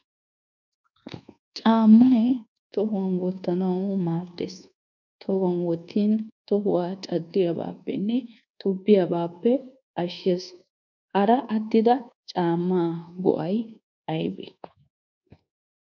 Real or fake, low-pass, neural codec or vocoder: fake; 7.2 kHz; codec, 24 kHz, 1.2 kbps, DualCodec